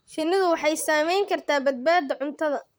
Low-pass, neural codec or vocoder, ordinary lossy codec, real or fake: none; vocoder, 44.1 kHz, 128 mel bands, Pupu-Vocoder; none; fake